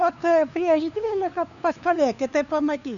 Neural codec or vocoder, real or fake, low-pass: codec, 16 kHz, 2 kbps, FunCodec, trained on LibriTTS, 25 frames a second; fake; 7.2 kHz